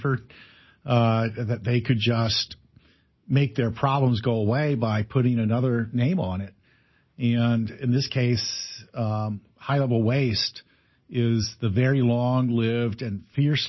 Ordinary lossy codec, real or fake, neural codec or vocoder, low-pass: MP3, 24 kbps; real; none; 7.2 kHz